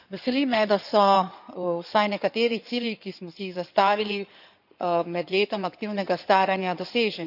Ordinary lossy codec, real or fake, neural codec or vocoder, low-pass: none; fake; codec, 16 kHz in and 24 kHz out, 2.2 kbps, FireRedTTS-2 codec; 5.4 kHz